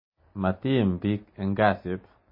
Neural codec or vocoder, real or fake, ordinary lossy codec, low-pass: none; real; MP3, 24 kbps; 5.4 kHz